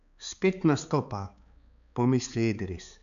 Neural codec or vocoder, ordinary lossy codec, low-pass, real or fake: codec, 16 kHz, 4 kbps, X-Codec, HuBERT features, trained on balanced general audio; none; 7.2 kHz; fake